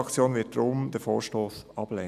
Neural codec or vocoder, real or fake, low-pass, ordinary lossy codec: none; real; 14.4 kHz; none